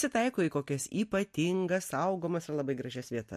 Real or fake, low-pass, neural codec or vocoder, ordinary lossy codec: real; 14.4 kHz; none; MP3, 64 kbps